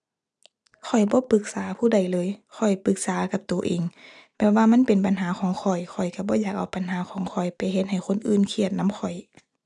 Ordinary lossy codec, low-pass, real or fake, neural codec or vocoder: none; 10.8 kHz; real; none